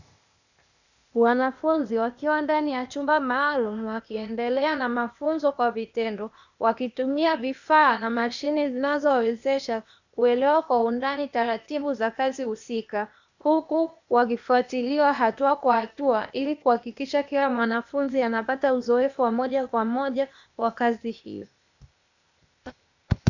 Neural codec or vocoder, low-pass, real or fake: codec, 16 kHz, 0.8 kbps, ZipCodec; 7.2 kHz; fake